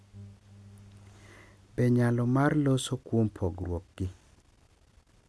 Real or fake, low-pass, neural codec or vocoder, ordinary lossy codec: real; none; none; none